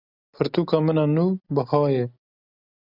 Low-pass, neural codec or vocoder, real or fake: 5.4 kHz; none; real